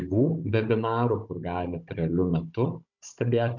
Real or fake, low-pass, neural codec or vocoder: fake; 7.2 kHz; codec, 16 kHz, 16 kbps, FunCodec, trained on Chinese and English, 50 frames a second